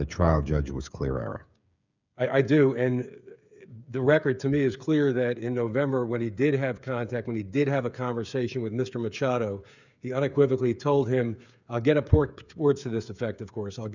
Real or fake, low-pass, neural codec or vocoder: fake; 7.2 kHz; codec, 16 kHz, 8 kbps, FreqCodec, smaller model